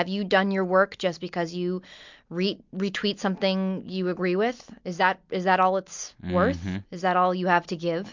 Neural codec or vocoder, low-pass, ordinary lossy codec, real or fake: none; 7.2 kHz; MP3, 64 kbps; real